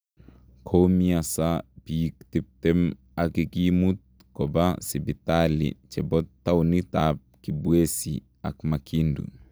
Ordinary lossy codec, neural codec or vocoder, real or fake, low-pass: none; none; real; none